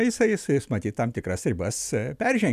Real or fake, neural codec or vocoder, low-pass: real; none; 14.4 kHz